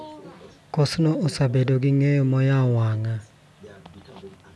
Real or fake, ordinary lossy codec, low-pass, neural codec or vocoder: real; none; none; none